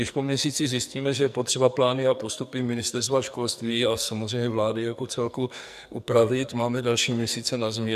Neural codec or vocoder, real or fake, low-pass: codec, 44.1 kHz, 2.6 kbps, SNAC; fake; 14.4 kHz